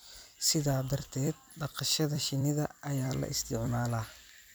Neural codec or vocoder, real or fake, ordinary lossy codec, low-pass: vocoder, 44.1 kHz, 128 mel bands every 256 samples, BigVGAN v2; fake; none; none